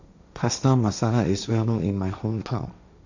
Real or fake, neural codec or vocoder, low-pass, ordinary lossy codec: fake; codec, 16 kHz, 1.1 kbps, Voila-Tokenizer; 7.2 kHz; none